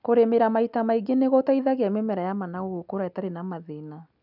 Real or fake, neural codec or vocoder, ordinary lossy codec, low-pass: real; none; none; 5.4 kHz